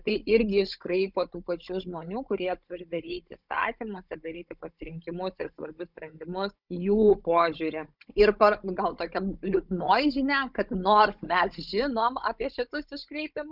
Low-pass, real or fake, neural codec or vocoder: 5.4 kHz; fake; codec, 16 kHz, 16 kbps, FunCodec, trained on Chinese and English, 50 frames a second